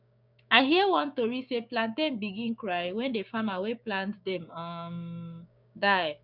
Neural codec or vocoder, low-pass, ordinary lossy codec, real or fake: codec, 16 kHz, 6 kbps, DAC; 5.4 kHz; none; fake